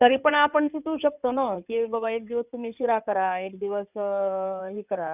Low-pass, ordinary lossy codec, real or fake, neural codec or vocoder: 3.6 kHz; none; fake; codec, 16 kHz in and 24 kHz out, 2.2 kbps, FireRedTTS-2 codec